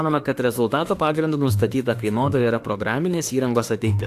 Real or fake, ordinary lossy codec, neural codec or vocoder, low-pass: fake; AAC, 64 kbps; autoencoder, 48 kHz, 32 numbers a frame, DAC-VAE, trained on Japanese speech; 14.4 kHz